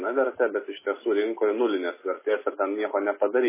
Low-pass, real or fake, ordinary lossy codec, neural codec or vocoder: 3.6 kHz; real; MP3, 16 kbps; none